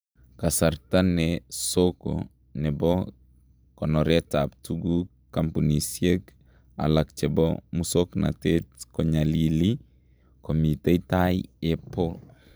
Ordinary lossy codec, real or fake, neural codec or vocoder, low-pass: none; fake; vocoder, 44.1 kHz, 128 mel bands every 512 samples, BigVGAN v2; none